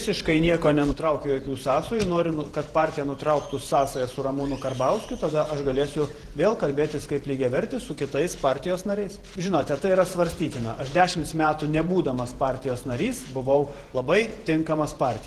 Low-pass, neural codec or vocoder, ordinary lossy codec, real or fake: 14.4 kHz; vocoder, 48 kHz, 128 mel bands, Vocos; Opus, 16 kbps; fake